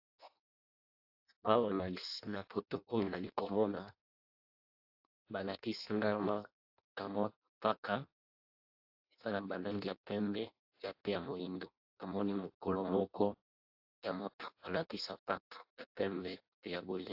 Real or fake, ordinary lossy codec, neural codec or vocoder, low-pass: fake; AAC, 48 kbps; codec, 16 kHz in and 24 kHz out, 0.6 kbps, FireRedTTS-2 codec; 5.4 kHz